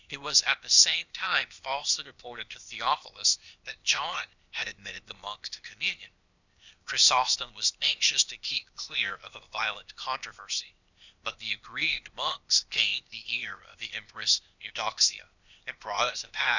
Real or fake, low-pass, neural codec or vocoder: fake; 7.2 kHz; codec, 16 kHz, 0.8 kbps, ZipCodec